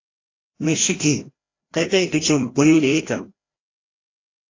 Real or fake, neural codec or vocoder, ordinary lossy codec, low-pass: fake; codec, 16 kHz, 1 kbps, FreqCodec, larger model; AAC, 32 kbps; 7.2 kHz